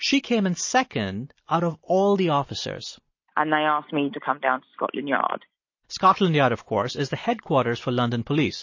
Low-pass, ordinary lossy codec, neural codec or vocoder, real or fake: 7.2 kHz; MP3, 32 kbps; none; real